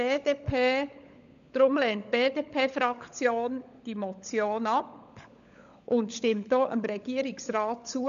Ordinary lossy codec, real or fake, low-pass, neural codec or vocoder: none; fake; 7.2 kHz; codec, 16 kHz, 16 kbps, FunCodec, trained on LibriTTS, 50 frames a second